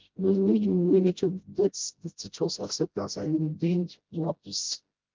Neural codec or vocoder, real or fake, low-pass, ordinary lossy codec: codec, 16 kHz, 0.5 kbps, FreqCodec, smaller model; fake; 7.2 kHz; Opus, 32 kbps